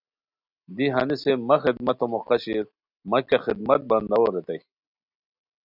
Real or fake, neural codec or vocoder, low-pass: real; none; 5.4 kHz